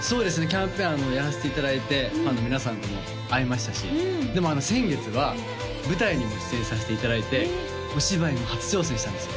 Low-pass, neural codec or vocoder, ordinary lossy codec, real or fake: none; none; none; real